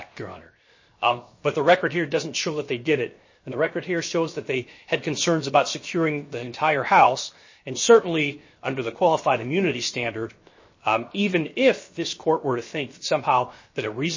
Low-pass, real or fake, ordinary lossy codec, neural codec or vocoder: 7.2 kHz; fake; MP3, 32 kbps; codec, 16 kHz, 0.7 kbps, FocalCodec